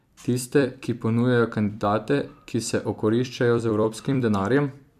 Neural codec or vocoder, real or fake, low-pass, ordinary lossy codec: vocoder, 44.1 kHz, 128 mel bands every 256 samples, BigVGAN v2; fake; 14.4 kHz; MP3, 96 kbps